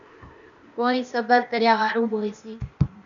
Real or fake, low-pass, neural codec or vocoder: fake; 7.2 kHz; codec, 16 kHz, 0.8 kbps, ZipCodec